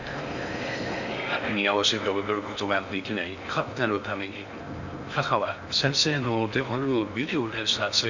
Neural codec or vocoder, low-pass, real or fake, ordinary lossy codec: codec, 16 kHz in and 24 kHz out, 0.6 kbps, FocalCodec, streaming, 4096 codes; 7.2 kHz; fake; none